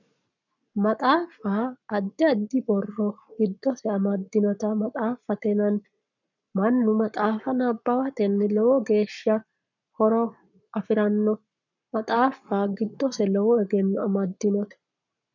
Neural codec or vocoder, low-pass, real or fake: codec, 44.1 kHz, 7.8 kbps, Pupu-Codec; 7.2 kHz; fake